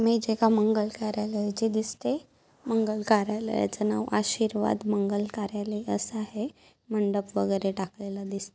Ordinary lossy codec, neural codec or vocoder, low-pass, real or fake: none; none; none; real